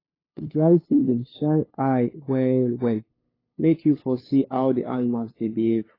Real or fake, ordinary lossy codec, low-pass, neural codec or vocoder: fake; AAC, 24 kbps; 5.4 kHz; codec, 16 kHz, 2 kbps, FunCodec, trained on LibriTTS, 25 frames a second